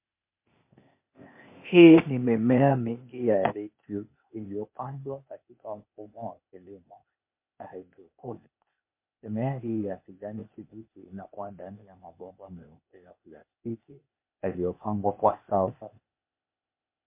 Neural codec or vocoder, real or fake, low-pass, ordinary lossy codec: codec, 16 kHz, 0.8 kbps, ZipCodec; fake; 3.6 kHz; MP3, 24 kbps